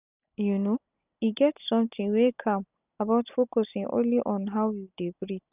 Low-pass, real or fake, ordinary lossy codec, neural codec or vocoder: 3.6 kHz; real; none; none